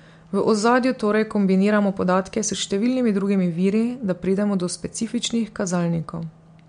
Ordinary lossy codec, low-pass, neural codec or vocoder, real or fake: MP3, 64 kbps; 9.9 kHz; none; real